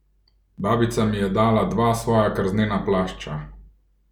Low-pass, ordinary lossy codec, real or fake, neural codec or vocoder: 19.8 kHz; none; real; none